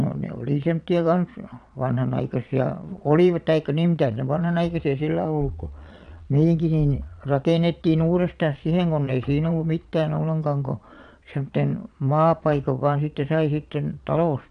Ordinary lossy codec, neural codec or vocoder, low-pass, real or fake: none; vocoder, 22.05 kHz, 80 mel bands, Vocos; 9.9 kHz; fake